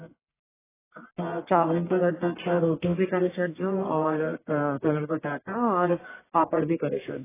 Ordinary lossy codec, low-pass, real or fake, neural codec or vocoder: AAC, 16 kbps; 3.6 kHz; fake; codec, 44.1 kHz, 1.7 kbps, Pupu-Codec